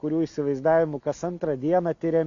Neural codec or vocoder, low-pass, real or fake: none; 7.2 kHz; real